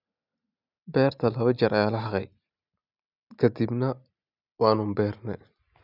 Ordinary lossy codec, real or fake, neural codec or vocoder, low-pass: none; real; none; 5.4 kHz